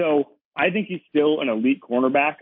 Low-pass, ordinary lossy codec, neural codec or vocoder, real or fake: 5.4 kHz; MP3, 32 kbps; none; real